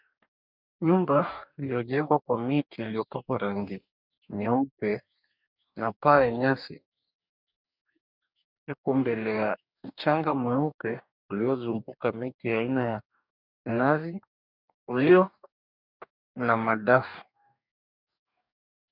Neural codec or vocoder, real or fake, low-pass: codec, 44.1 kHz, 2.6 kbps, DAC; fake; 5.4 kHz